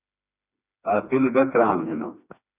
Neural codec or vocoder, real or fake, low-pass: codec, 16 kHz, 2 kbps, FreqCodec, smaller model; fake; 3.6 kHz